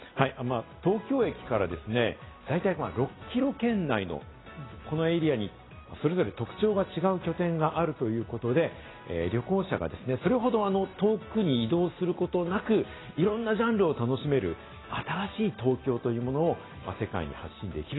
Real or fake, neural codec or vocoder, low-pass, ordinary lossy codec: real; none; 7.2 kHz; AAC, 16 kbps